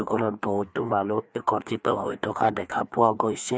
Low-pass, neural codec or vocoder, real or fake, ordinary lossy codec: none; codec, 16 kHz, 2 kbps, FreqCodec, larger model; fake; none